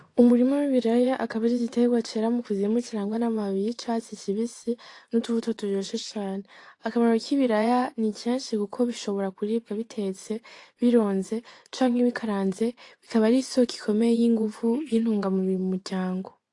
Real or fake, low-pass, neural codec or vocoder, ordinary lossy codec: fake; 10.8 kHz; vocoder, 24 kHz, 100 mel bands, Vocos; AAC, 48 kbps